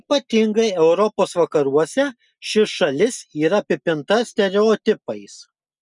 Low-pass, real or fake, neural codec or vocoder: 10.8 kHz; real; none